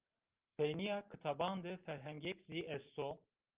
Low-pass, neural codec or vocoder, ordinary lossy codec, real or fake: 3.6 kHz; none; Opus, 32 kbps; real